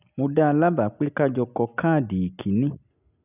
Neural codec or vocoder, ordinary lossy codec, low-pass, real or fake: none; none; 3.6 kHz; real